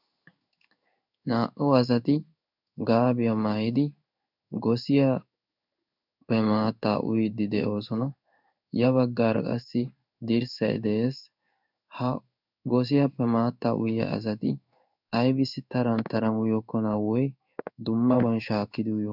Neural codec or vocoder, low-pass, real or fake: codec, 16 kHz in and 24 kHz out, 1 kbps, XY-Tokenizer; 5.4 kHz; fake